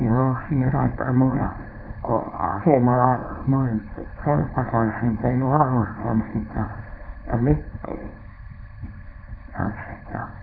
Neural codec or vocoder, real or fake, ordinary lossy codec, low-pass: codec, 24 kHz, 0.9 kbps, WavTokenizer, small release; fake; none; 5.4 kHz